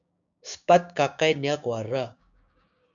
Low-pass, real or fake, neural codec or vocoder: 7.2 kHz; fake; codec, 16 kHz, 6 kbps, DAC